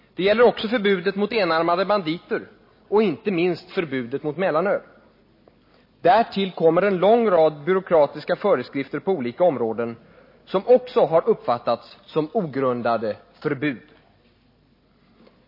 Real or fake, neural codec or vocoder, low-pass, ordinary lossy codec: real; none; 5.4 kHz; MP3, 24 kbps